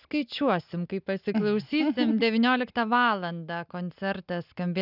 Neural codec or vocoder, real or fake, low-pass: none; real; 5.4 kHz